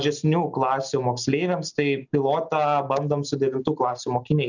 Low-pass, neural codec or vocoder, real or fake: 7.2 kHz; none; real